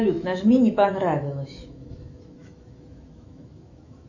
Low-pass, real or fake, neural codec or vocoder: 7.2 kHz; fake; autoencoder, 48 kHz, 128 numbers a frame, DAC-VAE, trained on Japanese speech